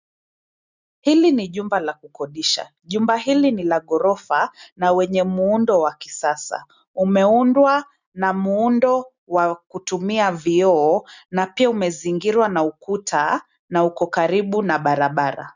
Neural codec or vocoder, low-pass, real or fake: none; 7.2 kHz; real